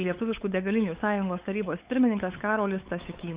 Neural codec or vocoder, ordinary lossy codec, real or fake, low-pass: codec, 16 kHz, 8 kbps, FunCodec, trained on LibriTTS, 25 frames a second; Opus, 64 kbps; fake; 3.6 kHz